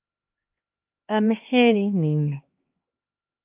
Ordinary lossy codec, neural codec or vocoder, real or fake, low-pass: Opus, 32 kbps; codec, 16 kHz, 2 kbps, X-Codec, HuBERT features, trained on LibriSpeech; fake; 3.6 kHz